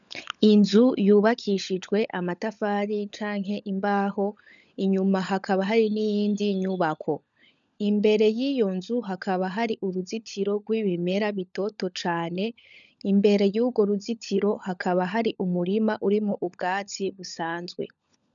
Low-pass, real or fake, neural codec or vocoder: 7.2 kHz; fake; codec, 16 kHz, 16 kbps, FunCodec, trained on LibriTTS, 50 frames a second